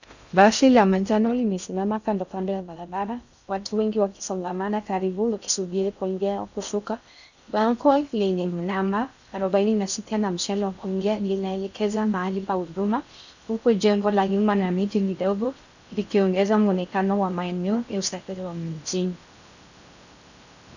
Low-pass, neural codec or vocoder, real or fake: 7.2 kHz; codec, 16 kHz in and 24 kHz out, 0.6 kbps, FocalCodec, streaming, 2048 codes; fake